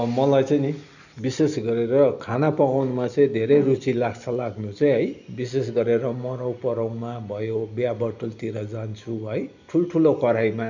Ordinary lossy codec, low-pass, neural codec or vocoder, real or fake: none; 7.2 kHz; none; real